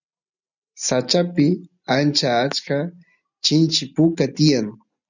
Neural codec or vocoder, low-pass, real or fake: none; 7.2 kHz; real